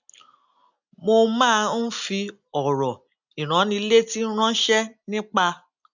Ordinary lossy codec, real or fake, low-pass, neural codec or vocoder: none; real; 7.2 kHz; none